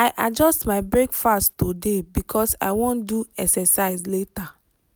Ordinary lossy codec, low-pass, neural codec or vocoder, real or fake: none; none; none; real